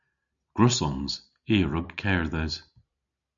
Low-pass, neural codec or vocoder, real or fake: 7.2 kHz; none; real